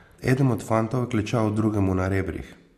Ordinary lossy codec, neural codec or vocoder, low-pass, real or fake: MP3, 64 kbps; none; 14.4 kHz; real